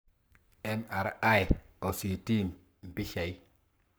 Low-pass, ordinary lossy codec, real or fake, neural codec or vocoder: none; none; fake; codec, 44.1 kHz, 7.8 kbps, Pupu-Codec